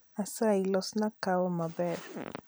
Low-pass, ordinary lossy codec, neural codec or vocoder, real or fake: none; none; vocoder, 44.1 kHz, 128 mel bands every 512 samples, BigVGAN v2; fake